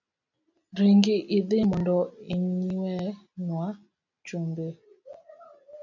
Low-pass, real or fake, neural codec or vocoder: 7.2 kHz; real; none